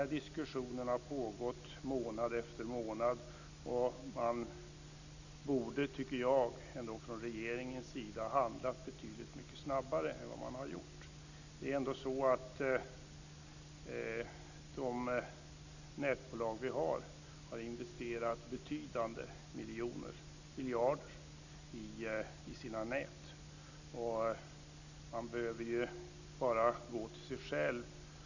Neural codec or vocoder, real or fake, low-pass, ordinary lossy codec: none; real; 7.2 kHz; none